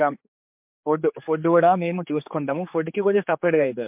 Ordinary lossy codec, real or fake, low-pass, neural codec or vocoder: MP3, 32 kbps; fake; 3.6 kHz; codec, 16 kHz, 4 kbps, X-Codec, HuBERT features, trained on general audio